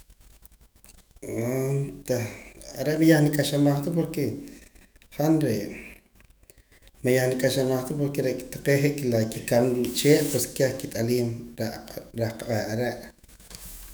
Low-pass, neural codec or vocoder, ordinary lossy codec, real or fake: none; autoencoder, 48 kHz, 128 numbers a frame, DAC-VAE, trained on Japanese speech; none; fake